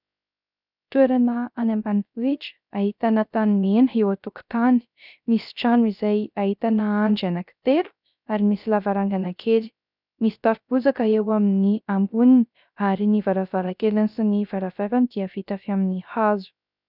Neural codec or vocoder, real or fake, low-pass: codec, 16 kHz, 0.3 kbps, FocalCodec; fake; 5.4 kHz